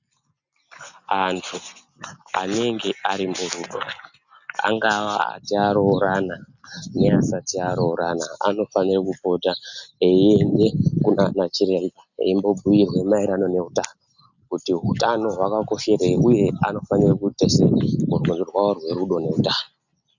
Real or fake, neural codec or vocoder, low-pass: real; none; 7.2 kHz